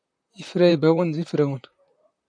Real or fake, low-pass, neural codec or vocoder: fake; 9.9 kHz; vocoder, 44.1 kHz, 128 mel bands, Pupu-Vocoder